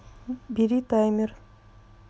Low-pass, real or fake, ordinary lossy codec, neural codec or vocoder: none; real; none; none